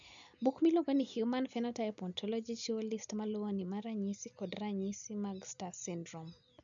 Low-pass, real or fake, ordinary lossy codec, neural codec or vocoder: 7.2 kHz; real; none; none